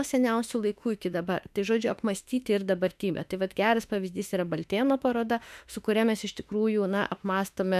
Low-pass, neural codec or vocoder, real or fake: 14.4 kHz; autoencoder, 48 kHz, 32 numbers a frame, DAC-VAE, trained on Japanese speech; fake